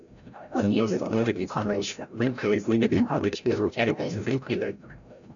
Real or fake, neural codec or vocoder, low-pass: fake; codec, 16 kHz, 0.5 kbps, FreqCodec, larger model; 7.2 kHz